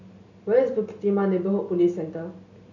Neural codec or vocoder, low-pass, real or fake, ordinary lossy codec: none; 7.2 kHz; real; none